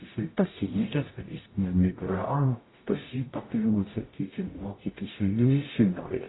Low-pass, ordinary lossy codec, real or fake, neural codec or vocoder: 7.2 kHz; AAC, 16 kbps; fake; codec, 44.1 kHz, 0.9 kbps, DAC